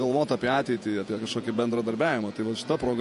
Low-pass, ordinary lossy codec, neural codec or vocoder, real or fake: 14.4 kHz; MP3, 48 kbps; vocoder, 48 kHz, 128 mel bands, Vocos; fake